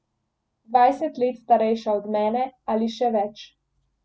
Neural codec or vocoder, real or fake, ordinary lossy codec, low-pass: none; real; none; none